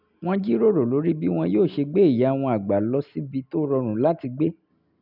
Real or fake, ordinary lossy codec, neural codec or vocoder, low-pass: real; none; none; 5.4 kHz